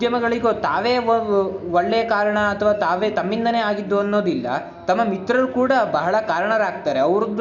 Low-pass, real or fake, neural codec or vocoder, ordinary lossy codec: 7.2 kHz; real; none; none